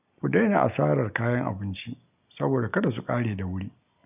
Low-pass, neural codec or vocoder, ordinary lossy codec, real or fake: 3.6 kHz; none; none; real